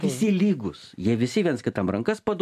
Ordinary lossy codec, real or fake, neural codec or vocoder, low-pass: MP3, 96 kbps; real; none; 14.4 kHz